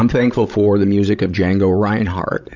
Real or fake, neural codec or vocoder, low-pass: fake; codec, 16 kHz, 16 kbps, FreqCodec, larger model; 7.2 kHz